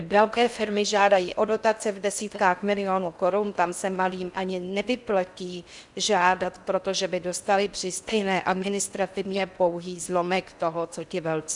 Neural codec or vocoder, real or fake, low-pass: codec, 16 kHz in and 24 kHz out, 0.6 kbps, FocalCodec, streaming, 4096 codes; fake; 10.8 kHz